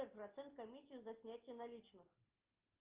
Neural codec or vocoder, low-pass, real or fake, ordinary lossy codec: none; 3.6 kHz; real; Opus, 32 kbps